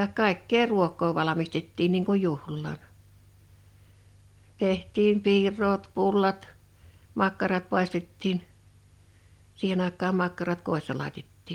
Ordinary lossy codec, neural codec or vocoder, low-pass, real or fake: Opus, 24 kbps; none; 19.8 kHz; real